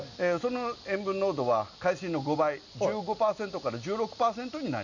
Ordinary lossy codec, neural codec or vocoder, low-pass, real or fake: none; none; 7.2 kHz; real